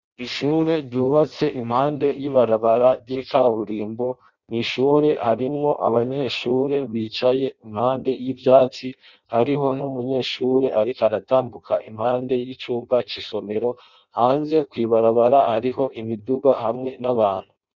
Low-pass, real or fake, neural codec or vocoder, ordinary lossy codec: 7.2 kHz; fake; codec, 16 kHz in and 24 kHz out, 0.6 kbps, FireRedTTS-2 codec; Opus, 64 kbps